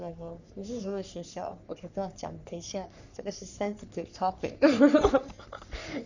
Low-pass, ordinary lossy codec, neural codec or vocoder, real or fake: 7.2 kHz; none; codec, 44.1 kHz, 3.4 kbps, Pupu-Codec; fake